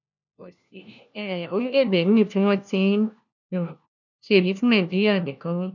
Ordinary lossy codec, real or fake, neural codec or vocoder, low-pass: none; fake; codec, 16 kHz, 1 kbps, FunCodec, trained on LibriTTS, 50 frames a second; 7.2 kHz